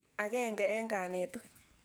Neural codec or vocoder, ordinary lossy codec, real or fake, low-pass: codec, 44.1 kHz, 2.6 kbps, SNAC; none; fake; none